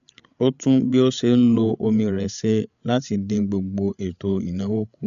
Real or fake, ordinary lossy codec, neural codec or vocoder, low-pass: fake; none; codec, 16 kHz, 8 kbps, FreqCodec, larger model; 7.2 kHz